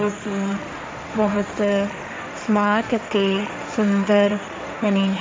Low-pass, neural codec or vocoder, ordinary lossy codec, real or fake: none; codec, 16 kHz, 1.1 kbps, Voila-Tokenizer; none; fake